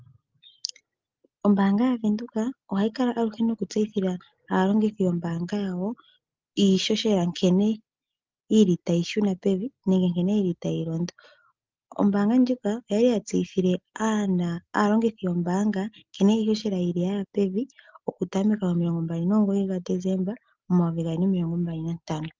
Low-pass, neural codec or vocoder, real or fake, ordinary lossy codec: 7.2 kHz; none; real; Opus, 32 kbps